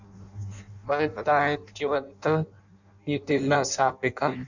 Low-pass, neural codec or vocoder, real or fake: 7.2 kHz; codec, 16 kHz in and 24 kHz out, 0.6 kbps, FireRedTTS-2 codec; fake